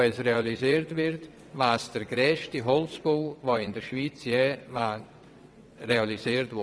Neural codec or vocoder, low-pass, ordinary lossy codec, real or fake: vocoder, 22.05 kHz, 80 mel bands, WaveNeXt; none; none; fake